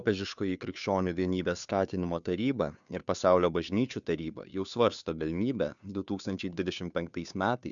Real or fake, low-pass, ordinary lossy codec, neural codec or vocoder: fake; 7.2 kHz; MP3, 96 kbps; codec, 16 kHz, 2 kbps, FunCodec, trained on Chinese and English, 25 frames a second